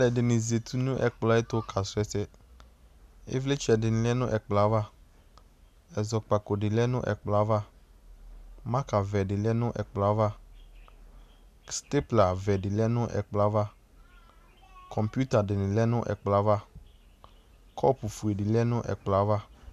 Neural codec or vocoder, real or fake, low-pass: none; real; 14.4 kHz